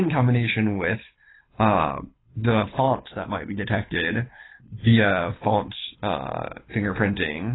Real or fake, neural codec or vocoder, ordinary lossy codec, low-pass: fake; codec, 24 kHz, 6 kbps, HILCodec; AAC, 16 kbps; 7.2 kHz